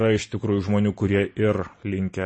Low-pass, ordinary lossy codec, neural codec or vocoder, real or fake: 9.9 kHz; MP3, 32 kbps; none; real